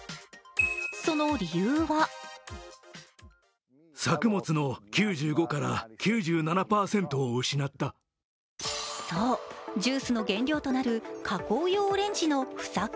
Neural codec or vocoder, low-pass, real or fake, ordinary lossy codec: none; none; real; none